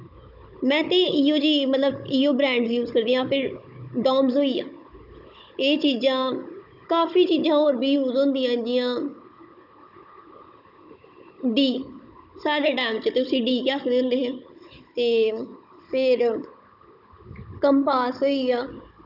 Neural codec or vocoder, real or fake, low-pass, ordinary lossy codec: codec, 16 kHz, 16 kbps, FunCodec, trained on Chinese and English, 50 frames a second; fake; 5.4 kHz; none